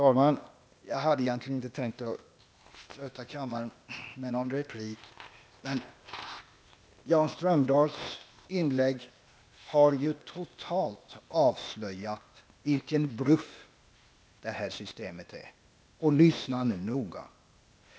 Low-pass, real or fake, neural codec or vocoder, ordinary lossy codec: none; fake; codec, 16 kHz, 0.8 kbps, ZipCodec; none